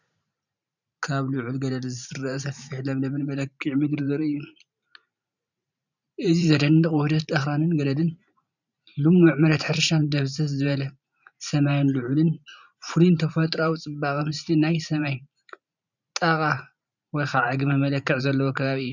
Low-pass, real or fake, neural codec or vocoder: 7.2 kHz; fake; vocoder, 44.1 kHz, 128 mel bands every 512 samples, BigVGAN v2